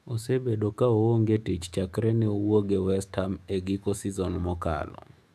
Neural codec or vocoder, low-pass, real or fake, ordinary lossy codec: autoencoder, 48 kHz, 128 numbers a frame, DAC-VAE, trained on Japanese speech; 14.4 kHz; fake; none